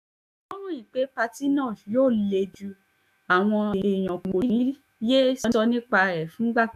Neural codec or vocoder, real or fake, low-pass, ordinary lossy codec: autoencoder, 48 kHz, 128 numbers a frame, DAC-VAE, trained on Japanese speech; fake; 14.4 kHz; none